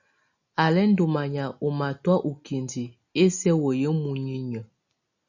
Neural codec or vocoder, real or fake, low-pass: none; real; 7.2 kHz